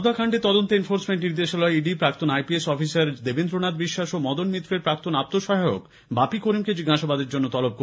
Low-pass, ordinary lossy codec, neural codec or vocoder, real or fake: none; none; none; real